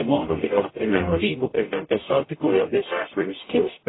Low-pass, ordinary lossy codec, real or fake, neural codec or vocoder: 7.2 kHz; AAC, 16 kbps; fake; codec, 44.1 kHz, 0.9 kbps, DAC